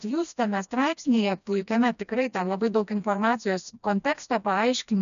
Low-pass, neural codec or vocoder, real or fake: 7.2 kHz; codec, 16 kHz, 1 kbps, FreqCodec, smaller model; fake